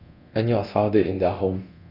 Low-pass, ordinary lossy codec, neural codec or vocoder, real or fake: 5.4 kHz; none; codec, 24 kHz, 0.9 kbps, DualCodec; fake